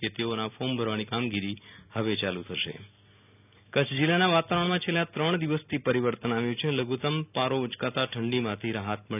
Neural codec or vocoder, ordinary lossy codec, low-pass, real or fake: none; none; 3.6 kHz; real